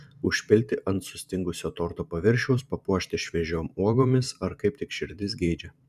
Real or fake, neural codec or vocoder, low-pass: fake; vocoder, 44.1 kHz, 128 mel bands every 512 samples, BigVGAN v2; 14.4 kHz